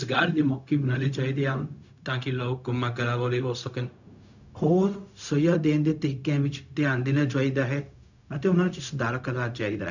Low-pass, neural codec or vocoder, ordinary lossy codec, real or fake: 7.2 kHz; codec, 16 kHz, 0.4 kbps, LongCat-Audio-Codec; none; fake